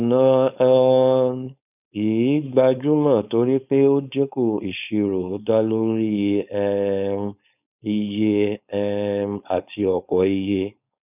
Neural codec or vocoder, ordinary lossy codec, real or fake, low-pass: codec, 16 kHz, 4.8 kbps, FACodec; none; fake; 3.6 kHz